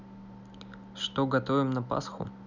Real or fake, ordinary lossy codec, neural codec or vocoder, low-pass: real; none; none; 7.2 kHz